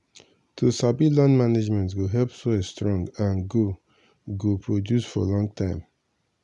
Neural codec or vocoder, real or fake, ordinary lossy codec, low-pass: none; real; none; 10.8 kHz